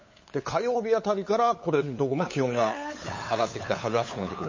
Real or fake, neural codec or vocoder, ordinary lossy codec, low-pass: fake; codec, 16 kHz, 8 kbps, FunCodec, trained on LibriTTS, 25 frames a second; MP3, 32 kbps; 7.2 kHz